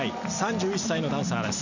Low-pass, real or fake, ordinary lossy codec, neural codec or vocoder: 7.2 kHz; real; none; none